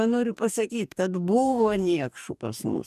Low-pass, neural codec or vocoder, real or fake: 14.4 kHz; codec, 44.1 kHz, 2.6 kbps, DAC; fake